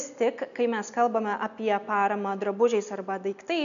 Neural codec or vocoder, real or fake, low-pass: none; real; 7.2 kHz